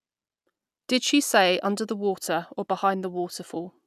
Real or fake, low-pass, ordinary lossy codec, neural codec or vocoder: real; 14.4 kHz; none; none